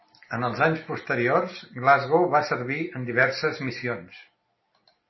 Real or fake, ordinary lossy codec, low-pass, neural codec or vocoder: real; MP3, 24 kbps; 7.2 kHz; none